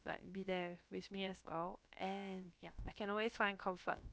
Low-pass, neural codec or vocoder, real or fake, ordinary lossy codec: none; codec, 16 kHz, about 1 kbps, DyCAST, with the encoder's durations; fake; none